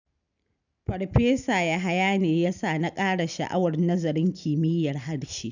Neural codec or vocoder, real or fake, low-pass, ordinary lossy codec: none; real; 7.2 kHz; none